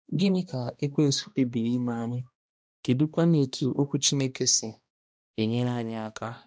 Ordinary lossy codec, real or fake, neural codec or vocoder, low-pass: none; fake; codec, 16 kHz, 1 kbps, X-Codec, HuBERT features, trained on balanced general audio; none